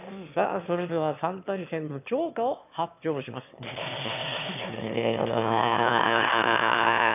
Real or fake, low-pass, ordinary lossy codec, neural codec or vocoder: fake; 3.6 kHz; none; autoencoder, 22.05 kHz, a latent of 192 numbers a frame, VITS, trained on one speaker